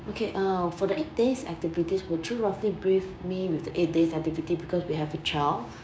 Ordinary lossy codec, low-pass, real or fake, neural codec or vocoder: none; none; fake; codec, 16 kHz, 6 kbps, DAC